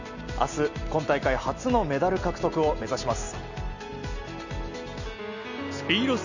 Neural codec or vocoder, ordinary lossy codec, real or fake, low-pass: none; none; real; 7.2 kHz